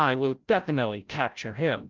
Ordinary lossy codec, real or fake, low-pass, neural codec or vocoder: Opus, 24 kbps; fake; 7.2 kHz; codec, 16 kHz, 0.5 kbps, FreqCodec, larger model